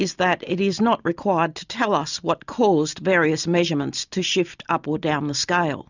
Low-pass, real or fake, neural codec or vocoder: 7.2 kHz; real; none